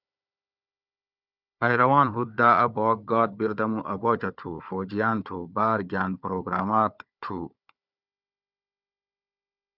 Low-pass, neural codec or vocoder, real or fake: 5.4 kHz; codec, 16 kHz, 4 kbps, FunCodec, trained on Chinese and English, 50 frames a second; fake